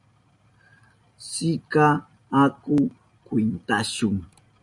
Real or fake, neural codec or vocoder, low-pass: real; none; 10.8 kHz